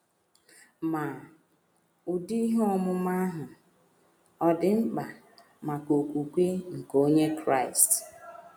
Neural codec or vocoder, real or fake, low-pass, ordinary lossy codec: none; real; none; none